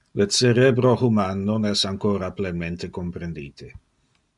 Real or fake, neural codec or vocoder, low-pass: real; none; 10.8 kHz